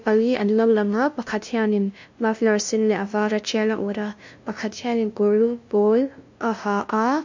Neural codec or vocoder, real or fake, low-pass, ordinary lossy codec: codec, 16 kHz, 0.5 kbps, FunCodec, trained on LibriTTS, 25 frames a second; fake; 7.2 kHz; MP3, 48 kbps